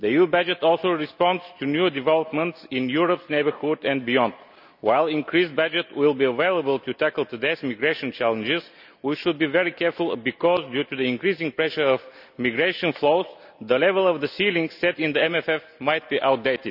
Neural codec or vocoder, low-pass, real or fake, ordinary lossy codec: none; 5.4 kHz; real; none